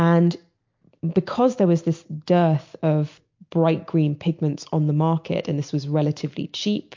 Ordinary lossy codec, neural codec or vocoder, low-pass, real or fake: MP3, 48 kbps; none; 7.2 kHz; real